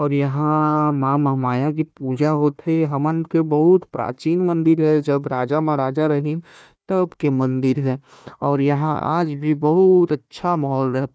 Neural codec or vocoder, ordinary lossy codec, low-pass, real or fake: codec, 16 kHz, 1 kbps, FunCodec, trained on Chinese and English, 50 frames a second; none; none; fake